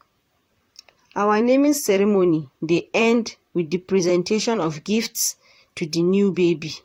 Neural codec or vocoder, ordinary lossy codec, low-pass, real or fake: vocoder, 44.1 kHz, 128 mel bands, Pupu-Vocoder; AAC, 48 kbps; 19.8 kHz; fake